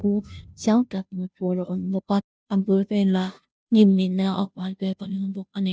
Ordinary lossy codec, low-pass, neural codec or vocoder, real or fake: none; none; codec, 16 kHz, 0.5 kbps, FunCodec, trained on Chinese and English, 25 frames a second; fake